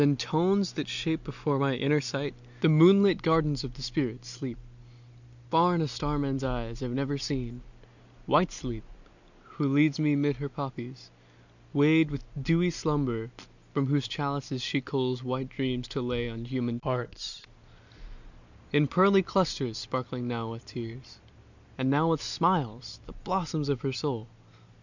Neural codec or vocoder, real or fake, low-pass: none; real; 7.2 kHz